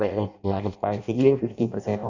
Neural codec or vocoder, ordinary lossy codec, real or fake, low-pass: codec, 16 kHz in and 24 kHz out, 0.6 kbps, FireRedTTS-2 codec; none; fake; 7.2 kHz